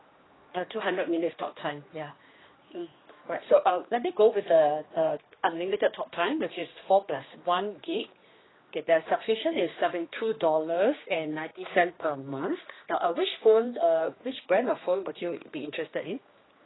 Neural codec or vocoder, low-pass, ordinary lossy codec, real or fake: codec, 16 kHz, 2 kbps, X-Codec, HuBERT features, trained on general audio; 7.2 kHz; AAC, 16 kbps; fake